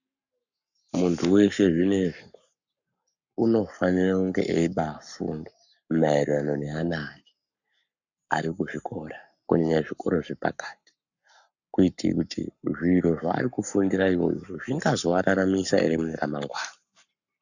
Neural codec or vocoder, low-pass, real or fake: codec, 44.1 kHz, 7.8 kbps, Pupu-Codec; 7.2 kHz; fake